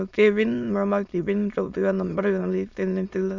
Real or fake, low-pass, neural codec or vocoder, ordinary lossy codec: fake; 7.2 kHz; autoencoder, 22.05 kHz, a latent of 192 numbers a frame, VITS, trained on many speakers; Opus, 64 kbps